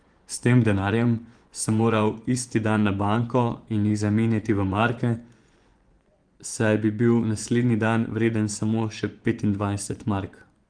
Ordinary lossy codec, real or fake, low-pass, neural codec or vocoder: Opus, 24 kbps; fake; 9.9 kHz; vocoder, 24 kHz, 100 mel bands, Vocos